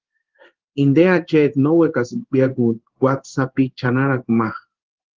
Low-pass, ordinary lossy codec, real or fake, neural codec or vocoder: 7.2 kHz; Opus, 16 kbps; fake; codec, 16 kHz in and 24 kHz out, 1 kbps, XY-Tokenizer